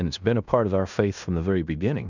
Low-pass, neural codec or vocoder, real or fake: 7.2 kHz; codec, 16 kHz in and 24 kHz out, 0.9 kbps, LongCat-Audio-Codec, fine tuned four codebook decoder; fake